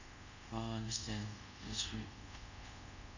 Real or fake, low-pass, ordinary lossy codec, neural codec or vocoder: fake; 7.2 kHz; none; codec, 24 kHz, 0.5 kbps, DualCodec